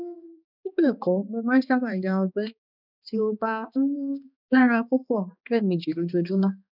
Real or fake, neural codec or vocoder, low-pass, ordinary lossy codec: fake; codec, 16 kHz, 2 kbps, X-Codec, HuBERT features, trained on balanced general audio; 5.4 kHz; none